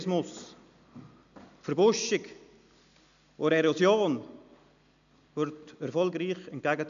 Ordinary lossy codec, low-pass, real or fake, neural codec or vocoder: none; 7.2 kHz; real; none